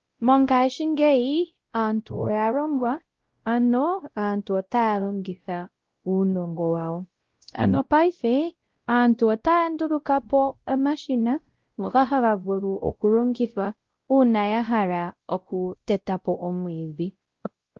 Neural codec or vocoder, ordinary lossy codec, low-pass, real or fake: codec, 16 kHz, 0.5 kbps, X-Codec, WavLM features, trained on Multilingual LibriSpeech; Opus, 16 kbps; 7.2 kHz; fake